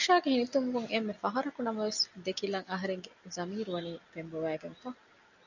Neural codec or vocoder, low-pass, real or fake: none; 7.2 kHz; real